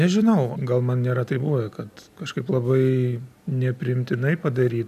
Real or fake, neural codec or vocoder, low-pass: real; none; 14.4 kHz